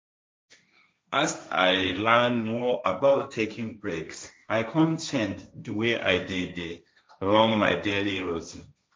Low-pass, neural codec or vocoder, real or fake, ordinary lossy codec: none; codec, 16 kHz, 1.1 kbps, Voila-Tokenizer; fake; none